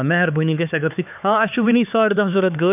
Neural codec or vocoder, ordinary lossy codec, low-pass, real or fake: codec, 16 kHz, 4 kbps, X-Codec, HuBERT features, trained on LibriSpeech; none; 3.6 kHz; fake